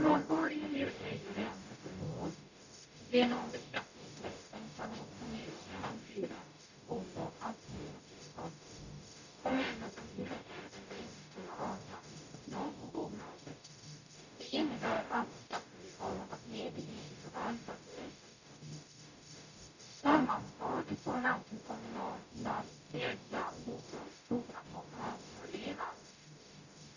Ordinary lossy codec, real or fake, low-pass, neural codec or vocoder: none; fake; 7.2 kHz; codec, 44.1 kHz, 0.9 kbps, DAC